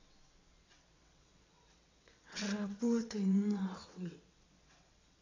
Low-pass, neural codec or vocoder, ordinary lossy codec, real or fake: 7.2 kHz; vocoder, 22.05 kHz, 80 mel bands, WaveNeXt; AAC, 48 kbps; fake